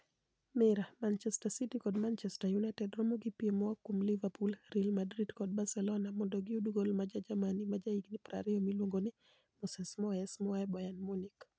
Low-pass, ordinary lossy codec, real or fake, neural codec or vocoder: none; none; real; none